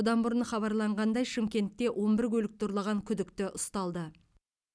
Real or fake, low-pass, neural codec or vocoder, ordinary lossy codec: real; none; none; none